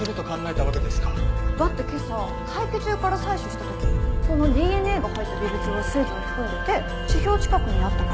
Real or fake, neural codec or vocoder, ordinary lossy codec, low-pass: real; none; none; none